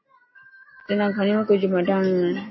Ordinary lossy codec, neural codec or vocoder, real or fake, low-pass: MP3, 24 kbps; none; real; 7.2 kHz